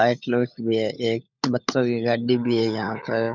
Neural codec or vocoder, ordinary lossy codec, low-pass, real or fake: codec, 16 kHz, 16 kbps, FunCodec, trained on LibriTTS, 50 frames a second; none; 7.2 kHz; fake